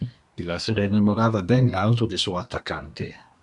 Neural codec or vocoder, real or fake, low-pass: codec, 24 kHz, 1 kbps, SNAC; fake; 10.8 kHz